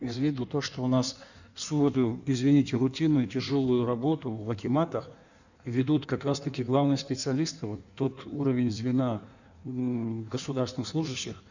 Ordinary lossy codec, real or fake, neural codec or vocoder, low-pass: none; fake; codec, 16 kHz in and 24 kHz out, 1.1 kbps, FireRedTTS-2 codec; 7.2 kHz